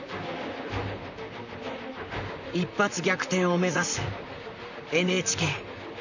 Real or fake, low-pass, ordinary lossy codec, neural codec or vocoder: fake; 7.2 kHz; none; vocoder, 44.1 kHz, 128 mel bands, Pupu-Vocoder